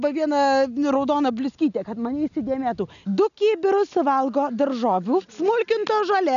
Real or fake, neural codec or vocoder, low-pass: real; none; 7.2 kHz